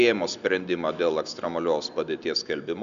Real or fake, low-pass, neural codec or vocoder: real; 7.2 kHz; none